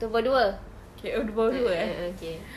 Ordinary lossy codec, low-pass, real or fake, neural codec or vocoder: none; 14.4 kHz; real; none